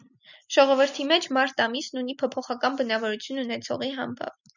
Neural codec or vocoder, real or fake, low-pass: none; real; 7.2 kHz